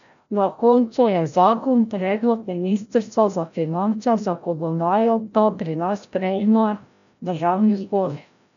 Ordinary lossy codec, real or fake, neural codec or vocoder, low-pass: none; fake; codec, 16 kHz, 0.5 kbps, FreqCodec, larger model; 7.2 kHz